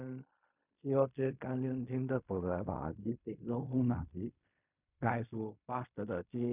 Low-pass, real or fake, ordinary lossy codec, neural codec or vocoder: 3.6 kHz; fake; none; codec, 16 kHz in and 24 kHz out, 0.4 kbps, LongCat-Audio-Codec, fine tuned four codebook decoder